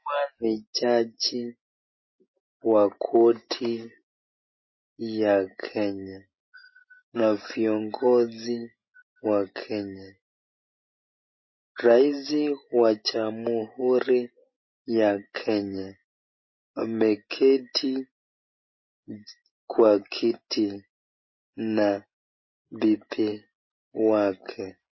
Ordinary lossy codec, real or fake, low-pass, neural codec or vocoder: MP3, 24 kbps; real; 7.2 kHz; none